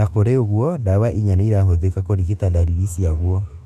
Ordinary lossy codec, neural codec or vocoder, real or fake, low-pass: none; autoencoder, 48 kHz, 32 numbers a frame, DAC-VAE, trained on Japanese speech; fake; 14.4 kHz